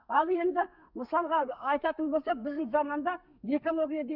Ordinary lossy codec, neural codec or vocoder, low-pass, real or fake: none; codec, 32 kHz, 1.9 kbps, SNAC; 5.4 kHz; fake